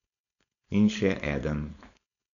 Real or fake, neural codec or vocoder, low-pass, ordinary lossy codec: fake; codec, 16 kHz, 4.8 kbps, FACodec; 7.2 kHz; none